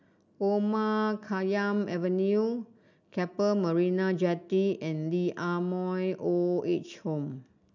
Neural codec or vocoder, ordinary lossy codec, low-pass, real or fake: none; none; 7.2 kHz; real